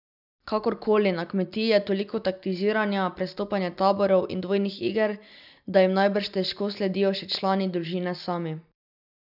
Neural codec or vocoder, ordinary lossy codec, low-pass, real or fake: none; none; 5.4 kHz; real